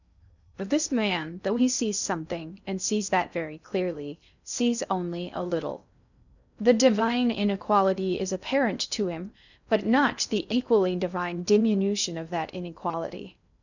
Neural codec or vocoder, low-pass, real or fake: codec, 16 kHz in and 24 kHz out, 0.6 kbps, FocalCodec, streaming, 2048 codes; 7.2 kHz; fake